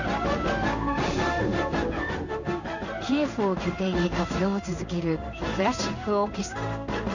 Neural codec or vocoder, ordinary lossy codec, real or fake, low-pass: codec, 16 kHz in and 24 kHz out, 1 kbps, XY-Tokenizer; none; fake; 7.2 kHz